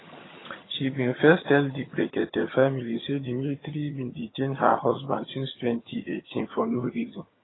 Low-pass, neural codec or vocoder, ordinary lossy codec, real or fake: 7.2 kHz; vocoder, 22.05 kHz, 80 mel bands, HiFi-GAN; AAC, 16 kbps; fake